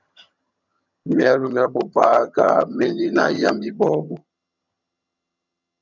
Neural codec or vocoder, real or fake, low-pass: vocoder, 22.05 kHz, 80 mel bands, HiFi-GAN; fake; 7.2 kHz